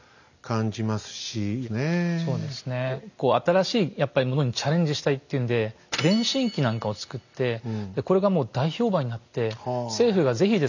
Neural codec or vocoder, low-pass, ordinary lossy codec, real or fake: none; 7.2 kHz; none; real